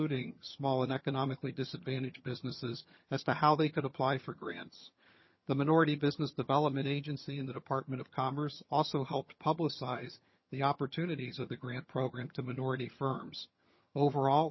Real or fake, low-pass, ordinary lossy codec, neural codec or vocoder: fake; 7.2 kHz; MP3, 24 kbps; vocoder, 22.05 kHz, 80 mel bands, HiFi-GAN